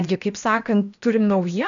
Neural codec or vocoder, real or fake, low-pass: codec, 16 kHz, about 1 kbps, DyCAST, with the encoder's durations; fake; 7.2 kHz